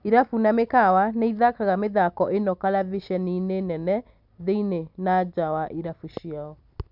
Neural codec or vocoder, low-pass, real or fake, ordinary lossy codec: none; 5.4 kHz; real; none